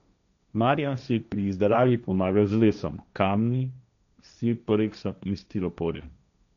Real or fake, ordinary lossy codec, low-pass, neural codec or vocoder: fake; none; 7.2 kHz; codec, 16 kHz, 1.1 kbps, Voila-Tokenizer